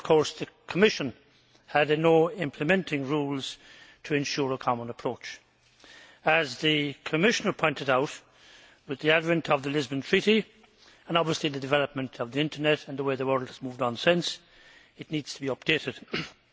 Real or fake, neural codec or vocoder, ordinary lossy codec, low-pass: real; none; none; none